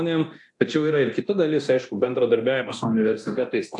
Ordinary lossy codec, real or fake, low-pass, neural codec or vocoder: AAC, 64 kbps; fake; 10.8 kHz; codec, 24 kHz, 0.9 kbps, DualCodec